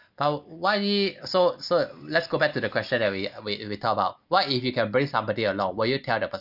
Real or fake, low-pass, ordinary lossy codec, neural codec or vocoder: real; 5.4 kHz; none; none